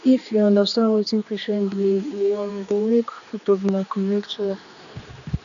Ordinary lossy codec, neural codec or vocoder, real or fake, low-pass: none; codec, 16 kHz, 2 kbps, X-Codec, HuBERT features, trained on general audio; fake; 7.2 kHz